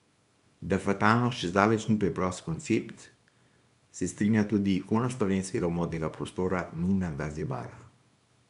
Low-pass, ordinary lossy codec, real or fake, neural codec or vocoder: 10.8 kHz; none; fake; codec, 24 kHz, 0.9 kbps, WavTokenizer, small release